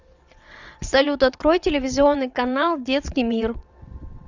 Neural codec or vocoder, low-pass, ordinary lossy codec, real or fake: vocoder, 22.05 kHz, 80 mel bands, WaveNeXt; 7.2 kHz; Opus, 64 kbps; fake